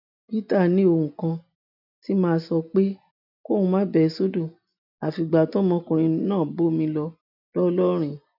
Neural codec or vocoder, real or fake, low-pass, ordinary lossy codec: none; real; 5.4 kHz; none